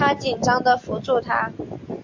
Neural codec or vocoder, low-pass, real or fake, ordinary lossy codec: none; 7.2 kHz; real; MP3, 48 kbps